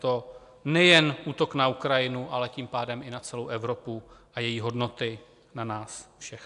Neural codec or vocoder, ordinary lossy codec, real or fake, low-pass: none; AAC, 96 kbps; real; 10.8 kHz